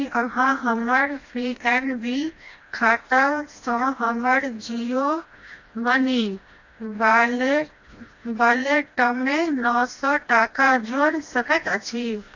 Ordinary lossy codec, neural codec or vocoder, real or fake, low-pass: AAC, 48 kbps; codec, 16 kHz, 1 kbps, FreqCodec, smaller model; fake; 7.2 kHz